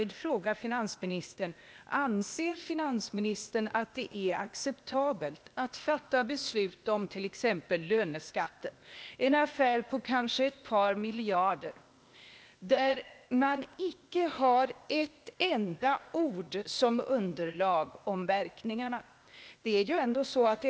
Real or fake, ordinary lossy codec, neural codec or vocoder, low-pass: fake; none; codec, 16 kHz, 0.8 kbps, ZipCodec; none